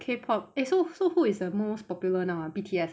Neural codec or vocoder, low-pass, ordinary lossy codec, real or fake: none; none; none; real